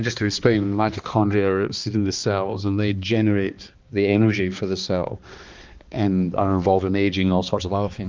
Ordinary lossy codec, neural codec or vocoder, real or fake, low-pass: Opus, 32 kbps; codec, 16 kHz, 2 kbps, X-Codec, HuBERT features, trained on balanced general audio; fake; 7.2 kHz